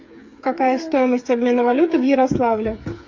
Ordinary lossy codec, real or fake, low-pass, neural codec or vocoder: AAC, 48 kbps; fake; 7.2 kHz; codec, 16 kHz, 8 kbps, FreqCodec, smaller model